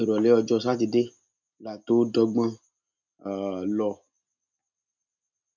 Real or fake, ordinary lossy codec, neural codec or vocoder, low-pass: real; none; none; 7.2 kHz